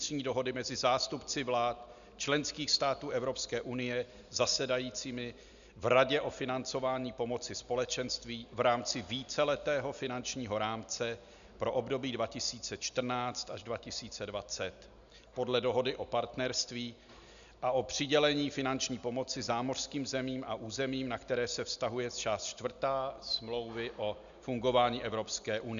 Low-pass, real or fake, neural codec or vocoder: 7.2 kHz; real; none